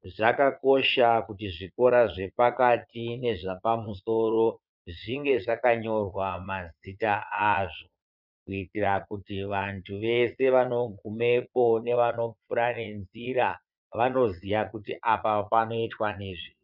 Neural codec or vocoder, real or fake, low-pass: vocoder, 22.05 kHz, 80 mel bands, Vocos; fake; 5.4 kHz